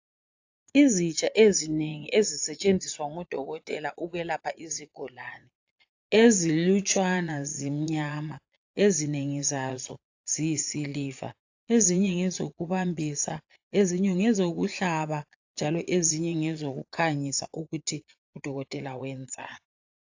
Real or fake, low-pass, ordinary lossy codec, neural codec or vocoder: fake; 7.2 kHz; AAC, 48 kbps; vocoder, 22.05 kHz, 80 mel bands, Vocos